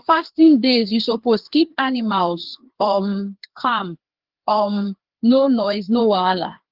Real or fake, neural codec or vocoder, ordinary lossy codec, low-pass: fake; codec, 16 kHz, 2 kbps, FreqCodec, larger model; Opus, 16 kbps; 5.4 kHz